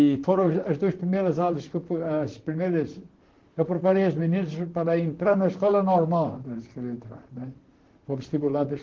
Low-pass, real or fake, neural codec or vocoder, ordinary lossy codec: 7.2 kHz; fake; vocoder, 44.1 kHz, 128 mel bands, Pupu-Vocoder; Opus, 16 kbps